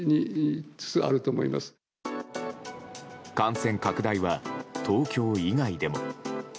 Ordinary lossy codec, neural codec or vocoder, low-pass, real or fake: none; none; none; real